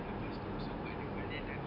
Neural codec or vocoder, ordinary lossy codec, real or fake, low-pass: none; none; real; 5.4 kHz